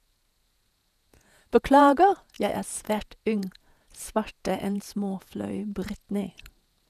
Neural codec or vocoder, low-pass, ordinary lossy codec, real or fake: vocoder, 48 kHz, 128 mel bands, Vocos; 14.4 kHz; none; fake